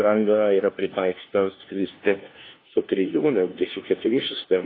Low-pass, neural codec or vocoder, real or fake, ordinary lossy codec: 5.4 kHz; codec, 16 kHz, 1 kbps, FunCodec, trained on LibriTTS, 50 frames a second; fake; AAC, 24 kbps